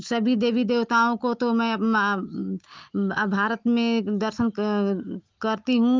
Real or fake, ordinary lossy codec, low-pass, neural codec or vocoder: real; Opus, 24 kbps; 7.2 kHz; none